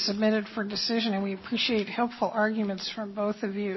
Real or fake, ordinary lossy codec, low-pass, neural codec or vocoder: real; MP3, 24 kbps; 7.2 kHz; none